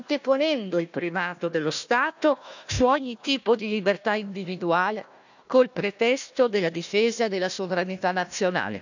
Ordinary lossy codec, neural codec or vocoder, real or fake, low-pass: none; codec, 16 kHz, 1 kbps, FunCodec, trained on Chinese and English, 50 frames a second; fake; 7.2 kHz